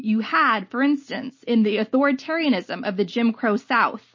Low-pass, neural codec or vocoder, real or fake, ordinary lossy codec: 7.2 kHz; none; real; MP3, 32 kbps